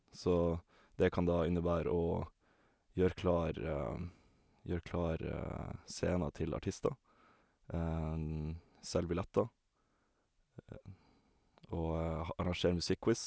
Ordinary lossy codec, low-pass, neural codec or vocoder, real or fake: none; none; none; real